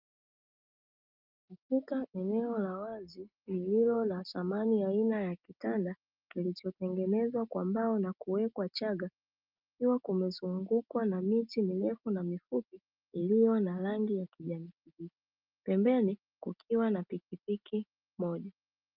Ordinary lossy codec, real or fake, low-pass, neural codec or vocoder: Opus, 32 kbps; real; 5.4 kHz; none